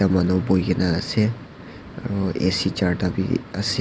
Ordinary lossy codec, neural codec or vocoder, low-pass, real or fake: none; none; none; real